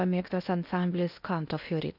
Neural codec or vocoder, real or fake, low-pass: codec, 16 kHz in and 24 kHz out, 0.6 kbps, FocalCodec, streaming, 2048 codes; fake; 5.4 kHz